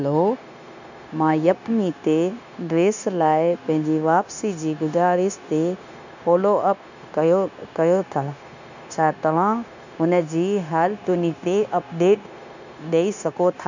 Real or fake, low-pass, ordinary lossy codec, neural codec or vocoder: fake; 7.2 kHz; none; codec, 16 kHz, 0.9 kbps, LongCat-Audio-Codec